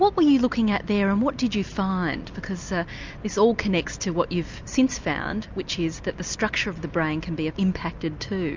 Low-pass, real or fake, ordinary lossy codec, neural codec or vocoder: 7.2 kHz; real; MP3, 64 kbps; none